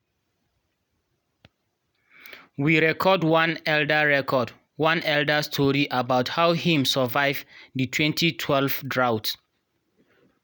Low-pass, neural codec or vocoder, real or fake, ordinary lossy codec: none; none; real; none